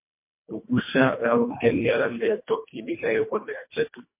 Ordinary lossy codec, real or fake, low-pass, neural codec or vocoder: MP3, 24 kbps; fake; 3.6 kHz; codec, 24 kHz, 1.5 kbps, HILCodec